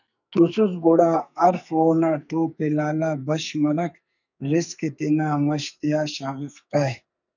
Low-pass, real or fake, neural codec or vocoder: 7.2 kHz; fake; codec, 44.1 kHz, 2.6 kbps, SNAC